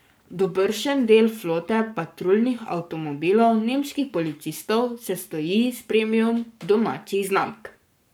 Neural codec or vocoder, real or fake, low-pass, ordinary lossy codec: codec, 44.1 kHz, 7.8 kbps, Pupu-Codec; fake; none; none